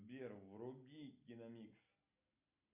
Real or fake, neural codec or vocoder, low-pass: real; none; 3.6 kHz